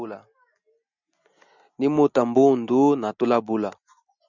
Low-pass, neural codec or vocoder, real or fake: 7.2 kHz; none; real